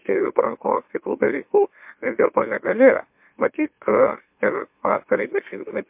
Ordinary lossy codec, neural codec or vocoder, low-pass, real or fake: MP3, 32 kbps; autoencoder, 44.1 kHz, a latent of 192 numbers a frame, MeloTTS; 3.6 kHz; fake